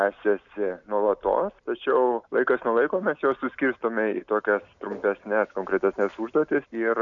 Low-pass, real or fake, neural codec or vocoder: 7.2 kHz; real; none